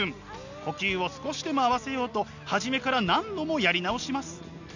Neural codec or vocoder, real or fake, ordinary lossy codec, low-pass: none; real; none; 7.2 kHz